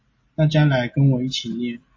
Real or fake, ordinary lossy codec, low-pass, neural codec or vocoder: real; MP3, 32 kbps; 7.2 kHz; none